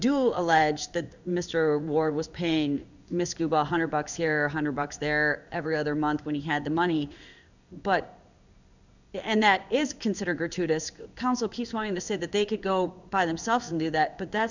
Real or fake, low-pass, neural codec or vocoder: fake; 7.2 kHz; codec, 16 kHz in and 24 kHz out, 1 kbps, XY-Tokenizer